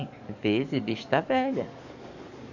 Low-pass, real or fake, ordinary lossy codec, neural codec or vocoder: 7.2 kHz; fake; none; codec, 44.1 kHz, 7.8 kbps, DAC